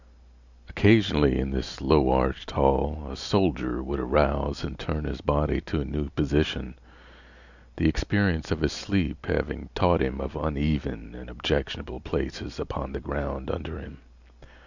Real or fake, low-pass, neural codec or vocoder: real; 7.2 kHz; none